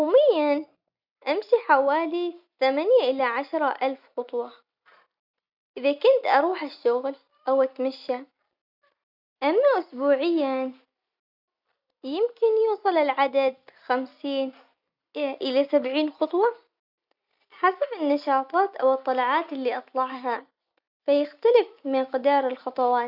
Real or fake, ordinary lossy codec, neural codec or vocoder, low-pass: real; none; none; 5.4 kHz